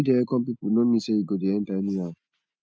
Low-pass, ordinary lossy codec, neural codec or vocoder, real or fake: none; none; none; real